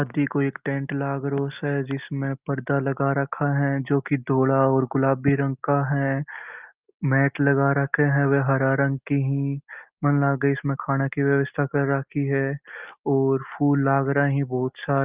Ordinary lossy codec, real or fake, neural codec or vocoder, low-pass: Opus, 32 kbps; real; none; 3.6 kHz